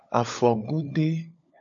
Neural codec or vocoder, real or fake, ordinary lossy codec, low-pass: codec, 16 kHz, 16 kbps, FunCodec, trained on LibriTTS, 50 frames a second; fake; AAC, 64 kbps; 7.2 kHz